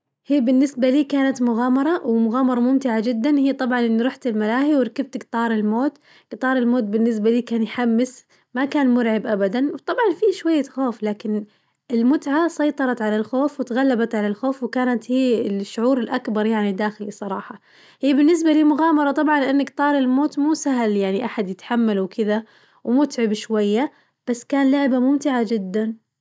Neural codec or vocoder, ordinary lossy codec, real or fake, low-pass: none; none; real; none